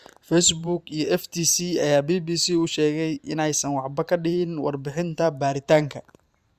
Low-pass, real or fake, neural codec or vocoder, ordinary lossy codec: 14.4 kHz; fake; vocoder, 44.1 kHz, 128 mel bands every 512 samples, BigVGAN v2; Opus, 64 kbps